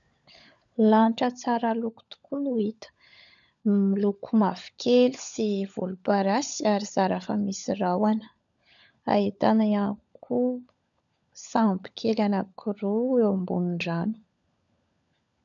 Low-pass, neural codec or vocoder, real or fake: 7.2 kHz; codec, 16 kHz, 16 kbps, FunCodec, trained on LibriTTS, 50 frames a second; fake